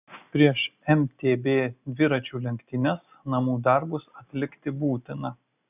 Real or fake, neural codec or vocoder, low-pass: real; none; 3.6 kHz